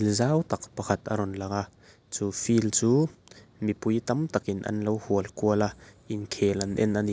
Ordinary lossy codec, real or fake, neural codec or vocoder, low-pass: none; real; none; none